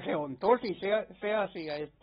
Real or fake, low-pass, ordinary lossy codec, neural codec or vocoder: real; 19.8 kHz; AAC, 16 kbps; none